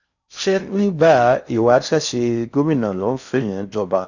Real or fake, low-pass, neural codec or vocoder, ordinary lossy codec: fake; 7.2 kHz; codec, 16 kHz in and 24 kHz out, 0.6 kbps, FocalCodec, streaming, 4096 codes; none